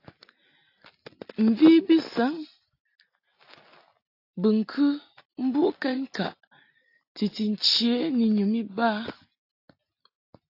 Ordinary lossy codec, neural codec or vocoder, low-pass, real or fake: AAC, 32 kbps; none; 5.4 kHz; real